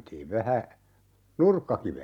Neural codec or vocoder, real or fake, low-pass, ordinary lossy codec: none; real; 19.8 kHz; none